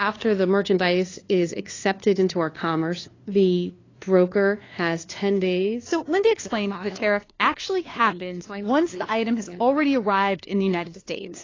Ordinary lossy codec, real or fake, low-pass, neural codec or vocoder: AAC, 32 kbps; fake; 7.2 kHz; codec, 16 kHz, 2 kbps, FunCodec, trained on LibriTTS, 25 frames a second